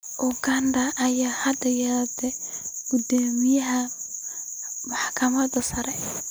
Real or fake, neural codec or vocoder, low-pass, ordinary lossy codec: real; none; none; none